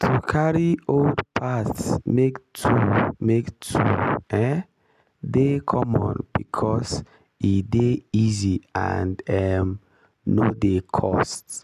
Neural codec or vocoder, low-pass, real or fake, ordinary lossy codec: none; 14.4 kHz; real; none